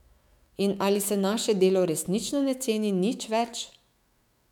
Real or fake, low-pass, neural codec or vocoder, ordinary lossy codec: fake; 19.8 kHz; autoencoder, 48 kHz, 128 numbers a frame, DAC-VAE, trained on Japanese speech; none